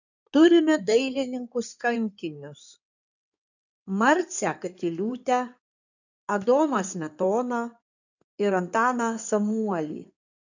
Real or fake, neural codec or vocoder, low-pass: fake; codec, 16 kHz in and 24 kHz out, 2.2 kbps, FireRedTTS-2 codec; 7.2 kHz